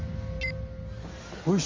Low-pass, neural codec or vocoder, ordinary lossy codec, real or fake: 7.2 kHz; none; Opus, 32 kbps; real